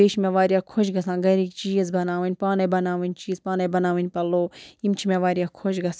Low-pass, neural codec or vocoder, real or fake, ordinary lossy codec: none; none; real; none